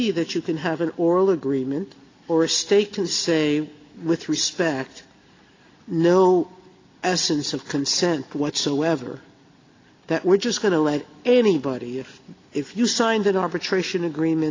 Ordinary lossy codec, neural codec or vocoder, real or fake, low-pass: AAC, 32 kbps; none; real; 7.2 kHz